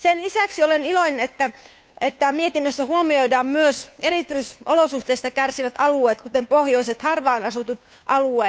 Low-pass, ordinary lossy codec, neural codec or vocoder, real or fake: none; none; codec, 16 kHz, 2 kbps, FunCodec, trained on Chinese and English, 25 frames a second; fake